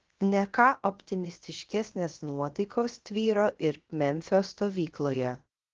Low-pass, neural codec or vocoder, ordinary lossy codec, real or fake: 7.2 kHz; codec, 16 kHz, 0.7 kbps, FocalCodec; Opus, 16 kbps; fake